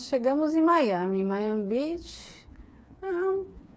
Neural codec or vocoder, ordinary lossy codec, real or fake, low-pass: codec, 16 kHz, 4 kbps, FreqCodec, smaller model; none; fake; none